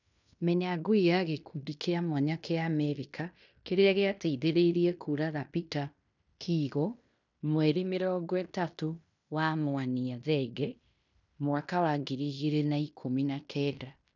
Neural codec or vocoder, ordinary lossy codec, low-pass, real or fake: codec, 16 kHz in and 24 kHz out, 0.9 kbps, LongCat-Audio-Codec, fine tuned four codebook decoder; none; 7.2 kHz; fake